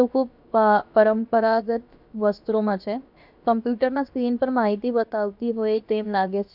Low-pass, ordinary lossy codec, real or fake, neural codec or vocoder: 5.4 kHz; none; fake; codec, 16 kHz, about 1 kbps, DyCAST, with the encoder's durations